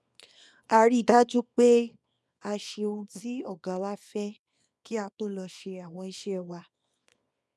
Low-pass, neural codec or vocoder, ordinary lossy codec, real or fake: none; codec, 24 kHz, 0.9 kbps, WavTokenizer, small release; none; fake